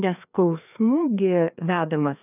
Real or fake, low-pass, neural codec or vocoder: fake; 3.6 kHz; codec, 16 kHz, 2 kbps, FreqCodec, larger model